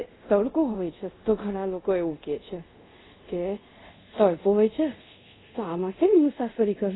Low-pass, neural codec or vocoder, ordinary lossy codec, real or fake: 7.2 kHz; codec, 24 kHz, 0.5 kbps, DualCodec; AAC, 16 kbps; fake